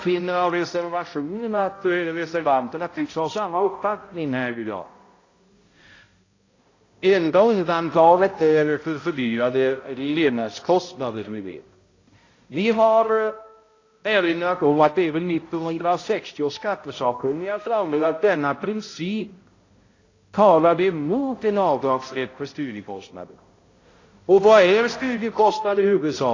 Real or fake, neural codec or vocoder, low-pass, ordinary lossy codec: fake; codec, 16 kHz, 0.5 kbps, X-Codec, HuBERT features, trained on balanced general audio; 7.2 kHz; AAC, 32 kbps